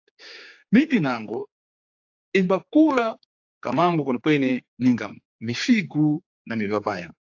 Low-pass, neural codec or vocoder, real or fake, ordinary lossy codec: 7.2 kHz; codec, 16 kHz, 4 kbps, X-Codec, HuBERT features, trained on general audio; fake; AAC, 48 kbps